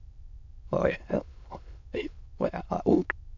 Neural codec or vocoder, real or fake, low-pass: autoencoder, 22.05 kHz, a latent of 192 numbers a frame, VITS, trained on many speakers; fake; 7.2 kHz